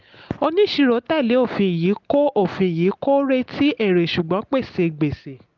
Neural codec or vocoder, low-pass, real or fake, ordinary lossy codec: none; 7.2 kHz; real; Opus, 32 kbps